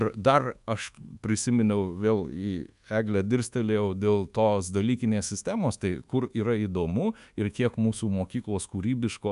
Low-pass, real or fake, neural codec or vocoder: 10.8 kHz; fake; codec, 24 kHz, 1.2 kbps, DualCodec